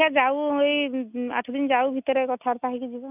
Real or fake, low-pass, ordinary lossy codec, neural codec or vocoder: real; 3.6 kHz; none; none